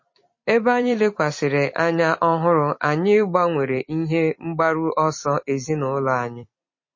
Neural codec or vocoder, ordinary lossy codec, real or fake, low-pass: vocoder, 44.1 kHz, 128 mel bands every 256 samples, BigVGAN v2; MP3, 32 kbps; fake; 7.2 kHz